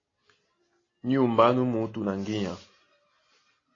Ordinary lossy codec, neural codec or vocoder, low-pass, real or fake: AAC, 32 kbps; none; 7.2 kHz; real